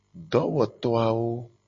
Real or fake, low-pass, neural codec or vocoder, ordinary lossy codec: real; 7.2 kHz; none; MP3, 32 kbps